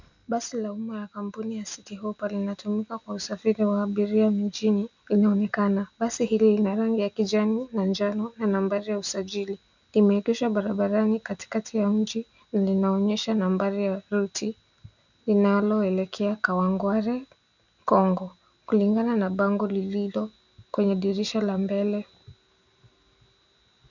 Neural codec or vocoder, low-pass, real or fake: none; 7.2 kHz; real